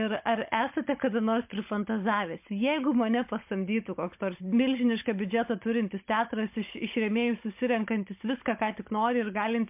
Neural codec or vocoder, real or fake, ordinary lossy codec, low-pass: codec, 16 kHz, 16 kbps, FunCodec, trained on Chinese and English, 50 frames a second; fake; MP3, 32 kbps; 3.6 kHz